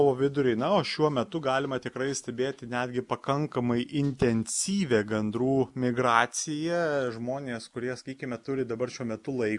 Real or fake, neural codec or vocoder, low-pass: real; none; 10.8 kHz